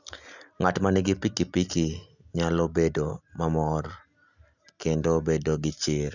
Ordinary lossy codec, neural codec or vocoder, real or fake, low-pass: none; none; real; 7.2 kHz